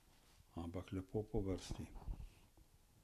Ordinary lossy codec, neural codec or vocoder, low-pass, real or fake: none; none; 14.4 kHz; real